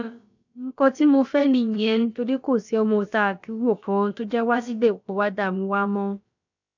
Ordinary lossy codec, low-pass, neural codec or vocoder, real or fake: none; 7.2 kHz; codec, 16 kHz, about 1 kbps, DyCAST, with the encoder's durations; fake